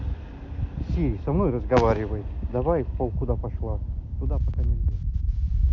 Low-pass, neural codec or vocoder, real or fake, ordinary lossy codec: 7.2 kHz; none; real; MP3, 64 kbps